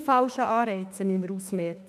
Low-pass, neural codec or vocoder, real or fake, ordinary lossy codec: 14.4 kHz; autoencoder, 48 kHz, 32 numbers a frame, DAC-VAE, trained on Japanese speech; fake; none